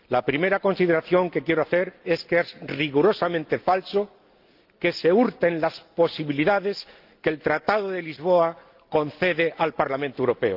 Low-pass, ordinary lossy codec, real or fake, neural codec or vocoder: 5.4 kHz; Opus, 32 kbps; real; none